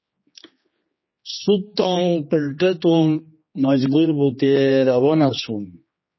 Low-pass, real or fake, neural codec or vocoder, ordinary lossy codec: 7.2 kHz; fake; codec, 16 kHz, 2 kbps, X-Codec, HuBERT features, trained on general audio; MP3, 24 kbps